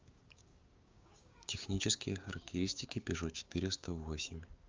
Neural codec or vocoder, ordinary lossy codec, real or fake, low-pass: codec, 16 kHz, 6 kbps, DAC; Opus, 32 kbps; fake; 7.2 kHz